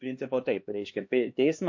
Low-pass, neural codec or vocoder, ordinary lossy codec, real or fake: 7.2 kHz; codec, 16 kHz, 2 kbps, X-Codec, HuBERT features, trained on LibriSpeech; MP3, 48 kbps; fake